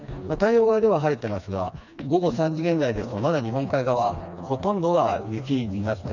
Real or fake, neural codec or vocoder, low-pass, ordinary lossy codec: fake; codec, 16 kHz, 2 kbps, FreqCodec, smaller model; 7.2 kHz; none